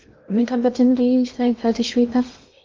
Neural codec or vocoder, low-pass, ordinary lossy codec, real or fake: codec, 16 kHz in and 24 kHz out, 0.8 kbps, FocalCodec, streaming, 65536 codes; 7.2 kHz; Opus, 32 kbps; fake